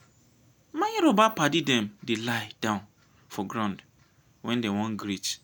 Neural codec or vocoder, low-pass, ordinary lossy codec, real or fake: none; none; none; real